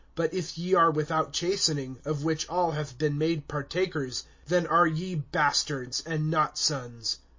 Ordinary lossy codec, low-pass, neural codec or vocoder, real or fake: MP3, 32 kbps; 7.2 kHz; none; real